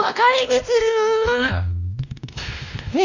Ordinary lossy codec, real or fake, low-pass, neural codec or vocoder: none; fake; 7.2 kHz; codec, 16 kHz, 1 kbps, X-Codec, WavLM features, trained on Multilingual LibriSpeech